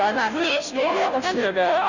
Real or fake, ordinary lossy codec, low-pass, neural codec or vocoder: fake; none; 7.2 kHz; codec, 16 kHz, 0.5 kbps, FunCodec, trained on Chinese and English, 25 frames a second